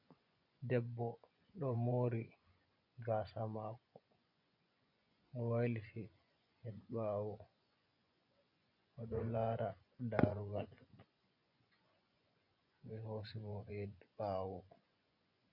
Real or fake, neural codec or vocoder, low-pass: fake; vocoder, 24 kHz, 100 mel bands, Vocos; 5.4 kHz